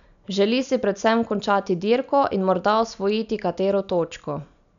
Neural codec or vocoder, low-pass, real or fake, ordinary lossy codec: none; 7.2 kHz; real; none